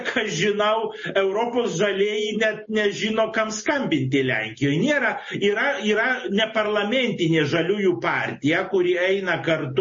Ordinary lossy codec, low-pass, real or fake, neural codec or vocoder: MP3, 32 kbps; 7.2 kHz; real; none